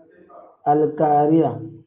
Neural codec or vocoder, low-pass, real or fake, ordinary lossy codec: none; 3.6 kHz; real; Opus, 32 kbps